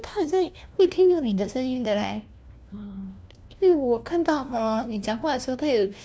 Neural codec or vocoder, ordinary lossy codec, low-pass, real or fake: codec, 16 kHz, 1 kbps, FunCodec, trained on LibriTTS, 50 frames a second; none; none; fake